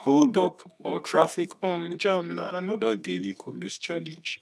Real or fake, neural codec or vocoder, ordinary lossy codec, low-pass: fake; codec, 24 kHz, 0.9 kbps, WavTokenizer, medium music audio release; none; none